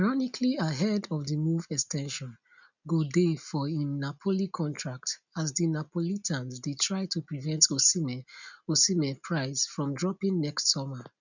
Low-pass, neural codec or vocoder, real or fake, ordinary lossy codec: 7.2 kHz; none; real; none